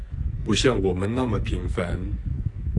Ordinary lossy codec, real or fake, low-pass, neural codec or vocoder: AAC, 48 kbps; fake; 10.8 kHz; codec, 44.1 kHz, 2.6 kbps, SNAC